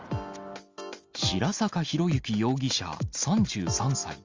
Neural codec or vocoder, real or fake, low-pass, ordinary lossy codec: none; real; 7.2 kHz; Opus, 32 kbps